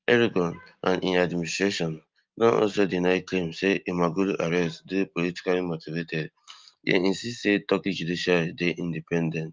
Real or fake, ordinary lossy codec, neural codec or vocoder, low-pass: real; Opus, 24 kbps; none; 7.2 kHz